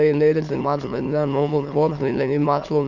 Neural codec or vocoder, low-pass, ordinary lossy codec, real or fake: autoencoder, 22.05 kHz, a latent of 192 numbers a frame, VITS, trained on many speakers; 7.2 kHz; none; fake